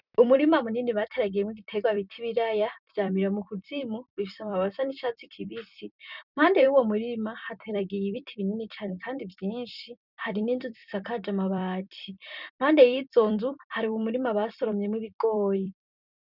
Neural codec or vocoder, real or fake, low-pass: vocoder, 44.1 kHz, 128 mel bands every 256 samples, BigVGAN v2; fake; 5.4 kHz